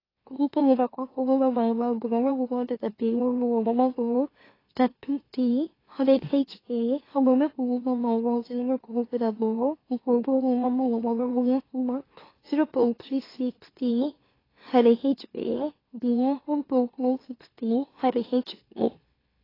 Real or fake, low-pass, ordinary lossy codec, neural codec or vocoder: fake; 5.4 kHz; AAC, 24 kbps; autoencoder, 44.1 kHz, a latent of 192 numbers a frame, MeloTTS